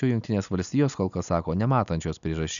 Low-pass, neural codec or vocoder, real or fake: 7.2 kHz; none; real